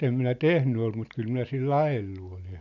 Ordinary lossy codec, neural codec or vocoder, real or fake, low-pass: none; none; real; 7.2 kHz